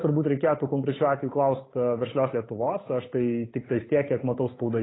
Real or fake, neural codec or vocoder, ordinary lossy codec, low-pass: fake; codec, 16 kHz, 8 kbps, FunCodec, trained on Chinese and English, 25 frames a second; AAC, 16 kbps; 7.2 kHz